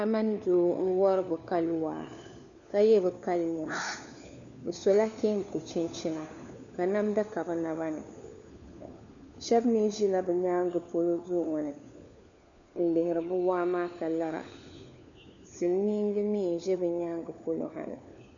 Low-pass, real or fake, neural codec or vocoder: 7.2 kHz; fake; codec, 16 kHz, 4 kbps, FunCodec, trained on LibriTTS, 50 frames a second